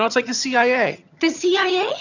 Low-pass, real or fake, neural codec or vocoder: 7.2 kHz; fake; vocoder, 22.05 kHz, 80 mel bands, HiFi-GAN